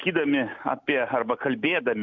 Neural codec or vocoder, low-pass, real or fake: none; 7.2 kHz; real